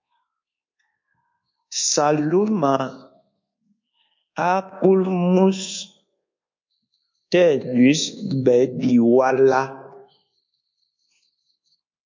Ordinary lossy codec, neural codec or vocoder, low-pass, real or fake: MP3, 48 kbps; codec, 24 kHz, 1.2 kbps, DualCodec; 7.2 kHz; fake